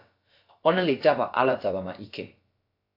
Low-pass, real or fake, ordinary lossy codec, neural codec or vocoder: 5.4 kHz; fake; AAC, 32 kbps; codec, 16 kHz, about 1 kbps, DyCAST, with the encoder's durations